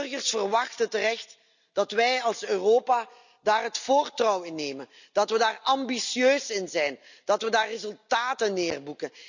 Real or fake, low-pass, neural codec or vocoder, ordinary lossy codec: real; 7.2 kHz; none; none